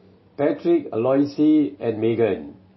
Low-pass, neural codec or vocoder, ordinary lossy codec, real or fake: 7.2 kHz; none; MP3, 24 kbps; real